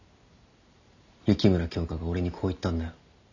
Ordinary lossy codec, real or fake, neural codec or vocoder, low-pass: none; real; none; 7.2 kHz